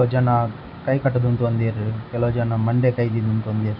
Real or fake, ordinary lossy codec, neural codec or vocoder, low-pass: real; none; none; 5.4 kHz